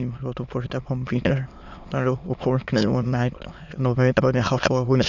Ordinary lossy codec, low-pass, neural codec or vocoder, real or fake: none; 7.2 kHz; autoencoder, 22.05 kHz, a latent of 192 numbers a frame, VITS, trained on many speakers; fake